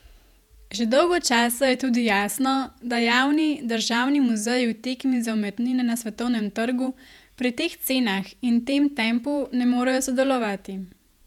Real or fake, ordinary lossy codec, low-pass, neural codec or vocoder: fake; none; 19.8 kHz; vocoder, 48 kHz, 128 mel bands, Vocos